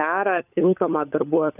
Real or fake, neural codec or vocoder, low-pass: fake; codec, 16 kHz, 16 kbps, FunCodec, trained on LibriTTS, 50 frames a second; 3.6 kHz